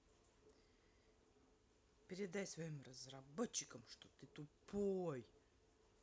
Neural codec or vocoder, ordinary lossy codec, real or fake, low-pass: none; none; real; none